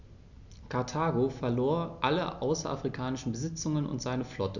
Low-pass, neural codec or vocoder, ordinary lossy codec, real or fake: 7.2 kHz; none; none; real